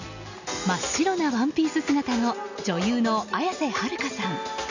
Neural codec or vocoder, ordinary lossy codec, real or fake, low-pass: none; none; real; 7.2 kHz